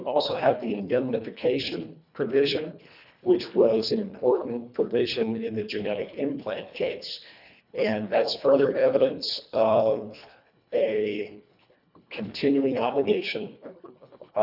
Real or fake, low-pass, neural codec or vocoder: fake; 5.4 kHz; codec, 24 kHz, 1.5 kbps, HILCodec